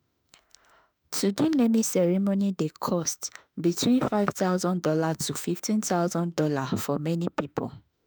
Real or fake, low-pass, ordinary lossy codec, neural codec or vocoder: fake; none; none; autoencoder, 48 kHz, 32 numbers a frame, DAC-VAE, trained on Japanese speech